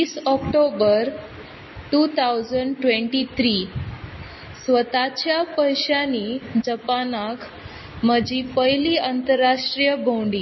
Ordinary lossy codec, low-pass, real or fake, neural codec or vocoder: MP3, 24 kbps; 7.2 kHz; real; none